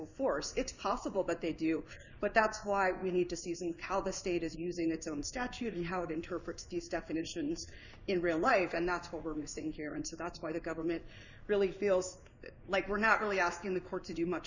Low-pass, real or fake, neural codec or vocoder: 7.2 kHz; fake; codec, 16 kHz in and 24 kHz out, 1 kbps, XY-Tokenizer